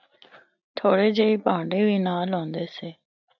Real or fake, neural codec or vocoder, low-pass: real; none; 7.2 kHz